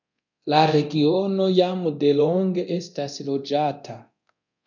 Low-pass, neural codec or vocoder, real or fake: 7.2 kHz; codec, 24 kHz, 0.9 kbps, DualCodec; fake